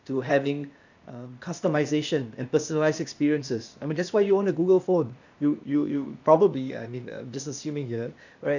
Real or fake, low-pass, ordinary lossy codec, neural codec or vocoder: fake; 7.2 kHz; none; codec, 16 kHz, 0.8 kbps, ZipCodec